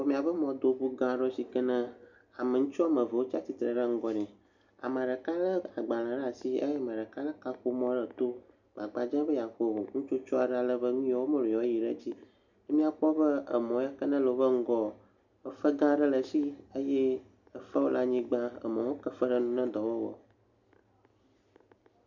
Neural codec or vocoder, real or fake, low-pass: none; real; 7.2 kHz